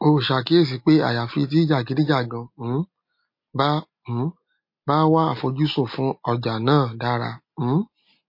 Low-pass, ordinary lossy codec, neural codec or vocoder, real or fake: 5.4 kHz; MP3, 32 kbps; none; real